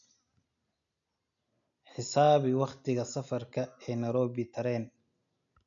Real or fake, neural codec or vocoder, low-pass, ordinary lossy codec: real; none; 7.2 kHz; none